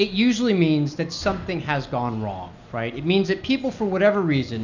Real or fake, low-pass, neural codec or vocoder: real; 7.2 kHz; none